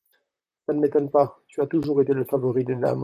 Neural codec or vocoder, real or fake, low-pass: vocoder, 44.1 kHz, 128 mel bands, Pupu-Vocoder; fake; 14.4 kHz